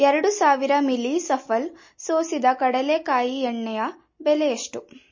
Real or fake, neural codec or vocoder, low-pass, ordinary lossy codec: real; none; 7.2 kHz; MP3, 32 kbps